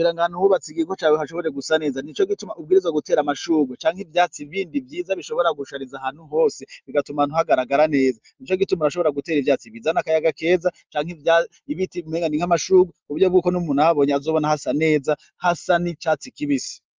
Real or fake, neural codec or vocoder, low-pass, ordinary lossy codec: real; none; 7.2 kHz; Opus, 24 kbps